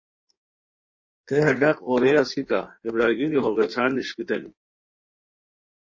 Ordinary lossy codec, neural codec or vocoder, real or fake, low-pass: MP3, 32 kbps; codec, 16 kHz in and 24 kHz out, 1.1 kbps, FireRedTTS-2 codec; fake; 7.2 kHz